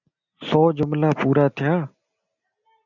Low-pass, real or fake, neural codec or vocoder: 7.2 kHz; real; none